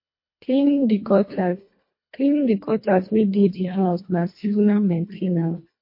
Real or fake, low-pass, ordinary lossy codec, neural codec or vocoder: fake; 5.4 kHz; MP3, 32 kbps; codec, 24 kHz, 1.5 kbps, HILCodec